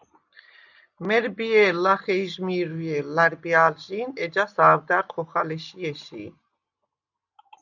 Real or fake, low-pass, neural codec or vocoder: real; 7.2 kHz; none